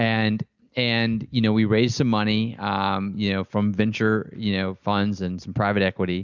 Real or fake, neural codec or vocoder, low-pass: real; none; 7.2 kHz